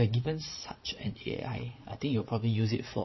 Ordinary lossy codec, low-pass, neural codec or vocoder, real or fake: MP3, 24 kbps; 7.2 kHz; vocoder, 22.05 kHz, 80 mel bands, Vocos; fake